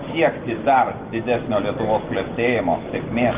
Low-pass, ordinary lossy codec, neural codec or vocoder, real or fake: 3.6 kHz; Opus, 24 kbps; none; real